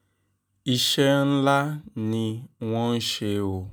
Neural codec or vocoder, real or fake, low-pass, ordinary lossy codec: none; real; none; none